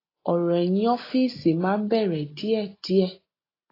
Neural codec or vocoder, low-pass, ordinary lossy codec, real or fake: none; 5.4 kHz; AAC, 24 kbps; real